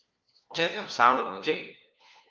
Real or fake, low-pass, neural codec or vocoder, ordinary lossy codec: fake; 7.2 kHz; codec, 16 kHz, 1 kbps, FunCodec, trained on LibriTTS, 50 frames a second; Opus, 24 kbps